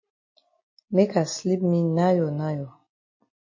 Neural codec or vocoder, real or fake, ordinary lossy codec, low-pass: none; real; MP3, 32 kbps; 7.2 kHz